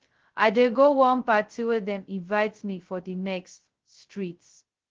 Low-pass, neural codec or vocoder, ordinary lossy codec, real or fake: 7.2 kHz; codec, 16 kHz, 0.2 kbps, FocalCodec; Opus, 16 kbps; fake